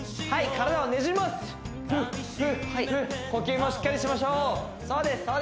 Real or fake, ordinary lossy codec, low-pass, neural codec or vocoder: real; none; none; none